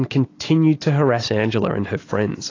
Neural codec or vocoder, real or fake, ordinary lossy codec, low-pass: none; real; AAC, 32 kbps; 7.2 kHz